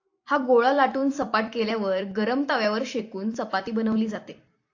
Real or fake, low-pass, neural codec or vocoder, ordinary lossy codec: fake; 7.2 kHz; vocoder, 44.1 kHz, 128 mel bands every 256 samples, BigVGAN v2; AAC, 48 kbps